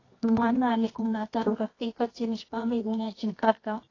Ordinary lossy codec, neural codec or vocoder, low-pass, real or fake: AAC, 32 kbps; codec, 24 kHz, 0.9 kbps, WavTokenizer, medium music audio release; 7.2 kHz; fake